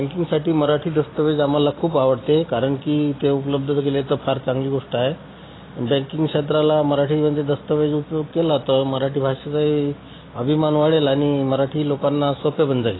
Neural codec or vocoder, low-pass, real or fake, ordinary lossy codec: none; 7.2 kHz; real; AAC, 16 kbps